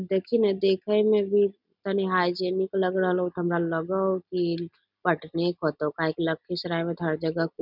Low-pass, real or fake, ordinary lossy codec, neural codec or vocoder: 5.4 kHz; real; none; none